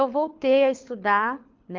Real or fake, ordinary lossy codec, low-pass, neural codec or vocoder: fake; Opus, 24 kbps; 7.2 kHz; codec, 24 kHz, 6 kbps, HILCodec